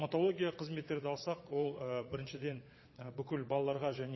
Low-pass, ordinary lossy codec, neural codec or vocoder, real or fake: 7.2 kHz; MP3, 24 kbps; vocoder, 22.05 kHz, 80 mel bands, Vocos; fake